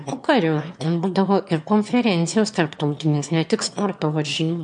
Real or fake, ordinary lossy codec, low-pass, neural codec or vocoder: fake; MP3, 64 kbps; 9.9 kHz; autoencoder, 22.05 kHz, a latent of 192 numbers a frame, VITS, trained on one speaker